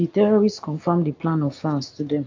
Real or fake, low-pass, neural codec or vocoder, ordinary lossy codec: real; 7.2 kHz; none; AAC, 48 kbps